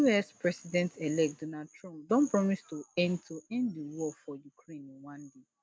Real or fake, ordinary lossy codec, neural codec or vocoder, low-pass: real; none; none; none